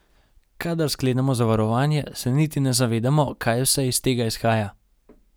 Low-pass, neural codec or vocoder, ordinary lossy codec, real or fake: none; none; none; real